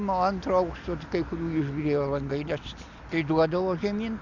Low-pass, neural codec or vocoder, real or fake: 7.2 kHz; none; real